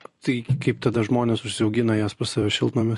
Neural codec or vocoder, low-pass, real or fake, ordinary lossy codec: vocoder, 44.1 kHz, 128 mel bands every 256 samples, BigVGAN v2; 14.4 kHz; fake; MP3, 48 kbps